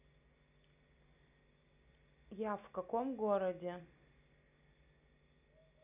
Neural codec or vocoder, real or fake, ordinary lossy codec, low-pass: none; real; none; 3.6 kHz